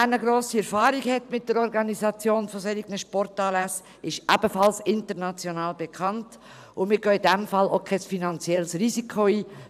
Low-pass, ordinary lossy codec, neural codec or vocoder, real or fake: 14.4 kHz; none; vocoder, 44.1 kHz, 128 mel bands every 512 samples, BigVGAN v2; fake